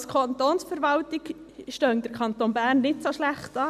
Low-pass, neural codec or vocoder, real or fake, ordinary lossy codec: 14.4 kHz; none; real; none